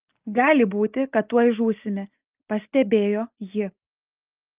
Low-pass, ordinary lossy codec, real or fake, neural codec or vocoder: 3.6 kHz; Opus, 24 kbps; real; none